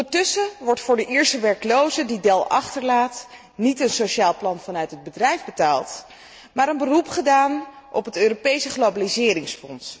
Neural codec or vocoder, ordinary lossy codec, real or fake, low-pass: none; none; real; none